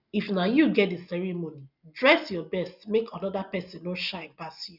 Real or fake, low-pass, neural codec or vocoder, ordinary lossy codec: real; 5.4 kHz; none; none